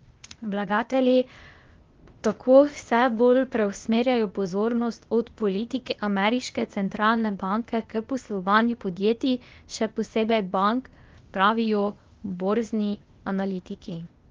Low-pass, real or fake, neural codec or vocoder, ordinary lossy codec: 7.2 kHz; fake; codec, 16 kHz, 0.8 kbps, ZipCodec; Opus, 32 kbps